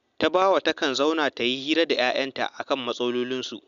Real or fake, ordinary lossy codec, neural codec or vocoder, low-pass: real; MP3, 96 kbps; none; 7.2 kHz